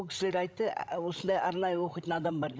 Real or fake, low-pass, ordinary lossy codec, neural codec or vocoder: fake; none; none; codec, 16 kHz, 16 kbps, FreqCodec, larger model